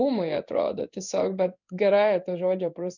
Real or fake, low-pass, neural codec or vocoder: fake; 7.2 kHz; codec, 16 kHz in and 24 kHz out, 1 kbps, XY-Tokenizer